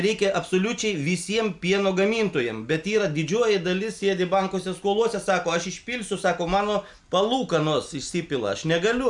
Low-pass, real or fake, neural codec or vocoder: 10.8 kHz; real; none